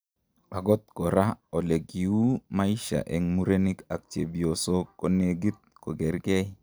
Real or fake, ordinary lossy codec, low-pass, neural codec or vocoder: real; none; none; none